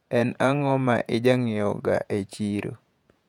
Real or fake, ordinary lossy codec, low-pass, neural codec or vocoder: fake; none; 19.8 kHz; vocoder, 48 kHz, 128 mel bands, Vocos